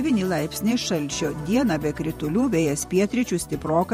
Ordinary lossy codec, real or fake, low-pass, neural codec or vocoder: MP3, 64 kbps; fake; 19.8 kHz; vocoder, 48 kHz, 128 mel bands, Vocos